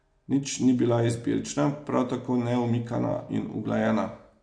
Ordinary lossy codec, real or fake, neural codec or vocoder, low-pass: MP3, 48 kbps; real; none; 9.9 kHz